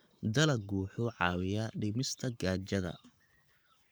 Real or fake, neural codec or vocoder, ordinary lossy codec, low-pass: fake; codec, 44.1 kHz, 7.8 kbps, Pupu-Codec; none; none